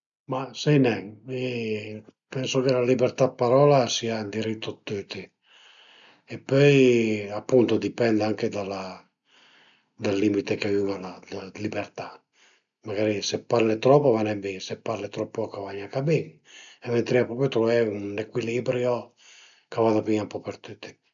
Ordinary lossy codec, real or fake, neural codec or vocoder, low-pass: none; real; none; 7.2 kHz